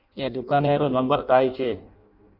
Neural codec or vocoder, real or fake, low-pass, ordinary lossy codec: codec, 16 kHz in and 24 kHz out, 0.6 kbps, FireRedTTS-2 codec; fake; 5.4 kHz; Opus, 64 kbps